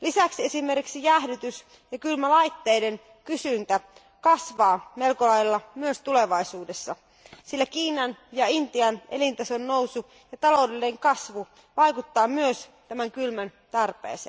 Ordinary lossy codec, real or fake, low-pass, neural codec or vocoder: none; real; none; none